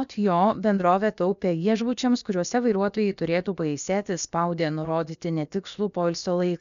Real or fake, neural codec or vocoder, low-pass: fake; codec, 16 kHz, about 1 kbps, DyCAST, with the encoder's durations; 7.2 kHz